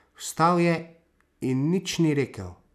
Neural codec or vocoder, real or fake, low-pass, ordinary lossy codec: none; real; 14.4 kHz; none